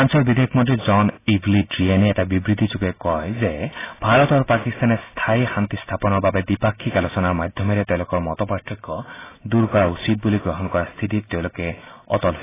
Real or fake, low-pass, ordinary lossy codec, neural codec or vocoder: real; 3.6 kHz; AAC, 16 kbps; none